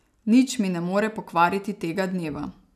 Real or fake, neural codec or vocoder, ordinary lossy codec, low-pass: real; none; none; 14.4 kHz